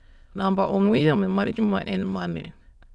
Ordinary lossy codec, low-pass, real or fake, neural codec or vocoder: none; none; fake; autoencoder, 22.05 kHz, a latent of 192 numbers a frame, VITS, trained on many speakers